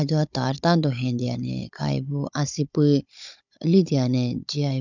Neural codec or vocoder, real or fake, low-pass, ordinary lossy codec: codec, 16 kHz, 16 kbps, FunCodec, trained on Chinese and English, 50 frames a second; fake; 7.2 kHz; none